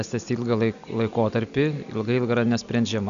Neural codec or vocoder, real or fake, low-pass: none; real; 7.2 kHz